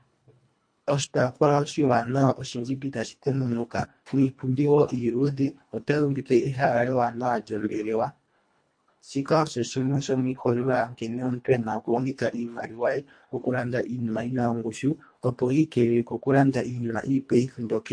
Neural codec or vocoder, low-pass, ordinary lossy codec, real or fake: codec, 24 kHz, 1.5 kbps, HILCodec; 9.9 kHz; MP3, 48 kbps; fake